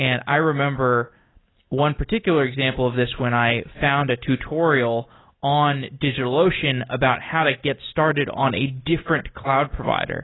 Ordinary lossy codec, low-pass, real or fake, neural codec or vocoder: AAC, 16 kbps; 7.2 kHz; real; none